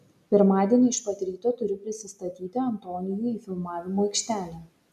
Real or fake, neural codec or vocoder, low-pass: real; none; 14.4 kHz